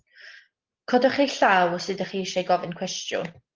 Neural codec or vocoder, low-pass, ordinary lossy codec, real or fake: none; 7.2 kHz; Opus, 16 kbps; real